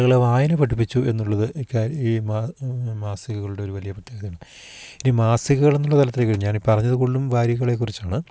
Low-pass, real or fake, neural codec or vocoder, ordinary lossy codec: none; real; none; none